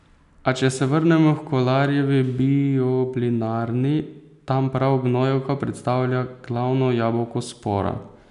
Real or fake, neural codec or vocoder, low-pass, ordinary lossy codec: real; none; 10.8 kHz; none